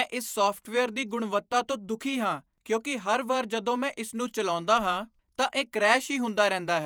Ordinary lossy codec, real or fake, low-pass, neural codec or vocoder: none; fake; none; vocoder, 48 kHz, 128 mel bands, Vocos